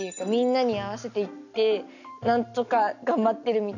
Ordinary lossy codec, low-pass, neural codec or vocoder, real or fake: none; 7.2 kHz; none; real